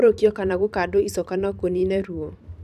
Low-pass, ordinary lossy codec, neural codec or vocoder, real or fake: 14.4 kHz; none; vocoder, 44.1 kHz, 128 mel bands every 512 samples, BigVGAN v2; fake